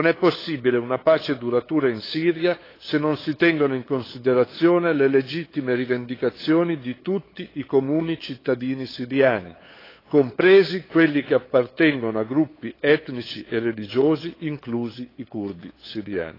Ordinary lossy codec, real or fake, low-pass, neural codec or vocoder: AAC, 24 kbps; fake; 5.4 kHz; codec, 16 kHz, 8 kbps, FunCodec, trained on LibriTTS, 25 frames a second